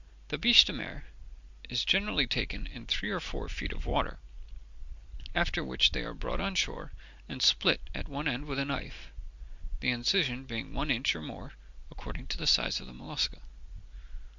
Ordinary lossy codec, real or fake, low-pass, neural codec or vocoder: Opus, 64 kbps; real; 7.2 kHz; none